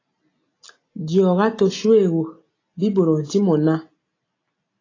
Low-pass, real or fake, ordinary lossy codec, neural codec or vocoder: 7.2 kHz; real; AAC, 32 kbps; none